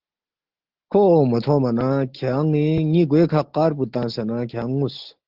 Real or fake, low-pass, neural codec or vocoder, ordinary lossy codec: real; 5.4 kHz; none; Opus, 16 kbps